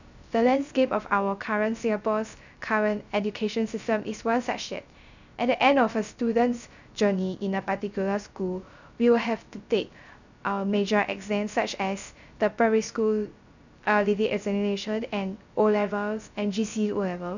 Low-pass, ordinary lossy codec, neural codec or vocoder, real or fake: 7.2 kHz; none; codec, 16 kHz, 0.3 kbps, FocalCodec; fake